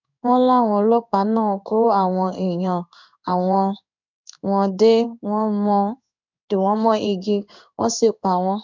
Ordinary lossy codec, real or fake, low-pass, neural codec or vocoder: none; fake; 7.2 kHz; codec, 16 kHz in and 24 kHz out, 1 kbps, XY-Tokenizer